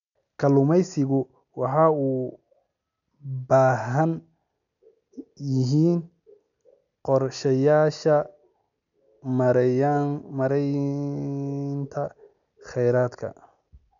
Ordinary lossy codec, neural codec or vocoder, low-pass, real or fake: none; none; 7.2 kHz; real